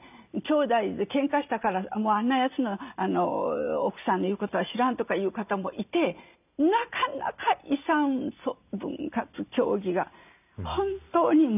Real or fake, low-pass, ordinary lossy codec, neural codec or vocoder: real; 3.6 kHz; none; none